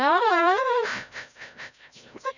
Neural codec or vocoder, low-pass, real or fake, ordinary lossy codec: codec, 16 kHz, 0.5 kbps, FreqCodec, larger model; 7.2 kHz; fake; none